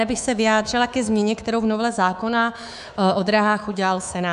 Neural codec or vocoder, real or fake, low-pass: codec, 24 kHz, 3.1 kbps, DualCodec; fake; 10.8 kHz